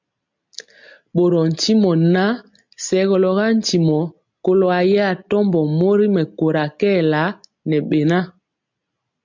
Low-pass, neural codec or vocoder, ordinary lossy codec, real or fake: 7.2 kHz; none; MP3, 64 kbps; real